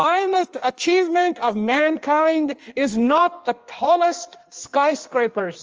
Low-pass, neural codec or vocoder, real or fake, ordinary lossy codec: 7.2 kHz; codec, 16 kHz in and 24 kHz out, 1.1 kbps, FireRedTTS-2 codec; fake; Opus, 24 kbps